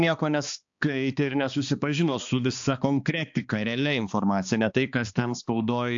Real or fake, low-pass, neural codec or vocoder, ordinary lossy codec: fake; 7.2 kHz; codec, 16 kHz, 2 kbps, X-Codec, HuBERT features, trained on balanced general audio; AAC, 64 kbps